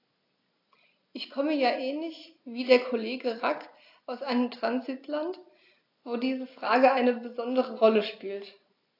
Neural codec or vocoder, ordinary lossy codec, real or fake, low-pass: none; AAC, 32 kbps; real; 5.4 kHz